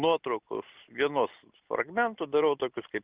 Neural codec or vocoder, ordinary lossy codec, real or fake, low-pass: none; Opus, 24 kbps; real; 3.6 kHz